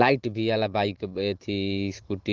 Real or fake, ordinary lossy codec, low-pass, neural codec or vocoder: fake; Opus, 16 kbps; 7.2 kHz; vocoder, 44.1 kHz, 128 mel bands every 512 samples, BigVGAN v2